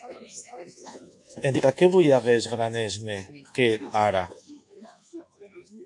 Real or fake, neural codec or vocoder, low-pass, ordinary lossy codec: fake; codec, 24 kHz, 1.2 kbps, DualCodec; 10.8 kHz; AAC, 64 kbps